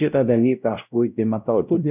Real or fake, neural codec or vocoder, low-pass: fake; codec, 16 kHz, 0.5 kbps, X-Codec, WavLM features, trained on Multilingual LibriSpeech; 3.6 kHz